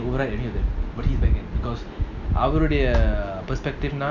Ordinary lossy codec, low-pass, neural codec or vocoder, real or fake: none; 7.2 kHz; none; real